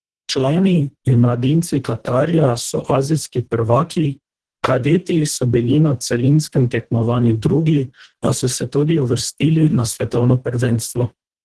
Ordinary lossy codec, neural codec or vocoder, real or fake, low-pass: Opus, 16 kbps; codec, 24 kHz, 1.5 kbps, HILCodec; fake; 10.8 kHz